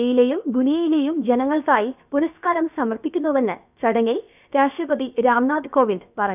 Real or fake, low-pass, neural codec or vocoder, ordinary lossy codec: fake; 3.6 kHz; codec, 16 kHz, about 1 kbps, DyCAST, with the encoder's durations; none